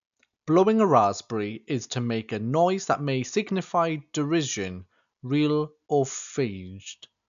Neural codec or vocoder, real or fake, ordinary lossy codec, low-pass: none; real; none; 7.2 kHz